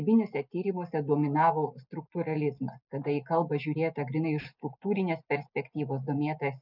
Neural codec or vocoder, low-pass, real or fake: none; 5.4 kHz; real